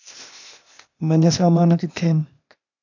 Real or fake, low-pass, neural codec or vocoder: fake; 7.2 kHz; codec, 16 kHz, 0.8 kbps, ZipCodec